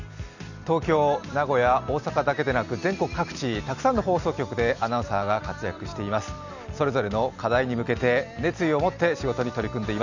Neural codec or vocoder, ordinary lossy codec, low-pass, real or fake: none; none; 7.2 kHz; real